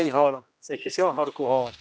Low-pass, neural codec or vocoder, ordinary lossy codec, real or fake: none; codec, 16 kHz, 1 kbps, X-Codec, HuBERT features, trained on general audio; none; fake